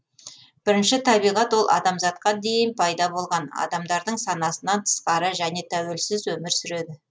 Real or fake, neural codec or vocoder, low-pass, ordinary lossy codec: real; none; none; none